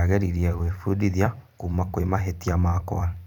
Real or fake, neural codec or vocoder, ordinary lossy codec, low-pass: fake; vocoder, 44.1 kHz, 128 mel bands every 256 samples, BigVGAN v2; none; 19.8 kHz